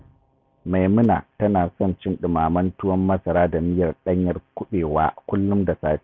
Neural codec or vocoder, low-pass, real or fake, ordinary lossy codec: none; none; real; none